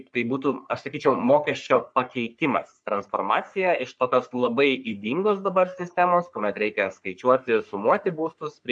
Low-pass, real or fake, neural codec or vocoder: 9.9 kHz; fake; codec, 44.1 kHz, 3.4 kbps, Pupu-Codec